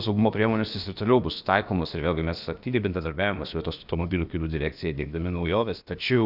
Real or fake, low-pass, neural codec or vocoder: fake; 5.4 kHz; codec, 16 kHz, about 1 kbps, DyCAST, with the encoder's durations